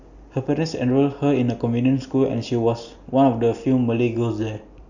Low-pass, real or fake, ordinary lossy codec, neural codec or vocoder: 7.2 kHz; real; none; none